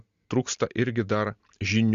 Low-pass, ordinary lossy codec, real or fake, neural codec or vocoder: 7.2 kHz; Opus, 64 kbps; real; none